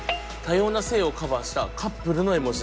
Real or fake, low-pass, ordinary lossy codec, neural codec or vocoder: real; none; none; none